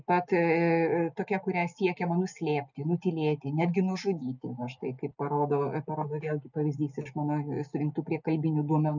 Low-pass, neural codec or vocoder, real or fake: 7.2 kHz; none; real